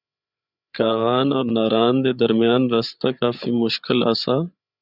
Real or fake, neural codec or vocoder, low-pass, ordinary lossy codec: fake; codec, 16 kHz, 8 kbps, FreqCodec, larger model; 5.4 kHz; Opus, 64 kbps